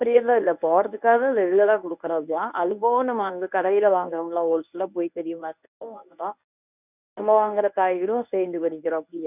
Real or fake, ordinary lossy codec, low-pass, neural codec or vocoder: fake; none; 3.6 kHz; codec, 24 kHz, 0.9 kbps, WavTokenizer, medium speech release version 1